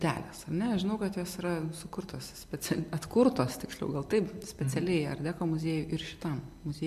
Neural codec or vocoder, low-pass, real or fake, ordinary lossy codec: none; 14.4 kHz; real; MP3, 64 kbps